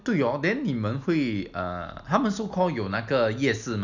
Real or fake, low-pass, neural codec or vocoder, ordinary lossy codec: real; 7.2 kHz; none; none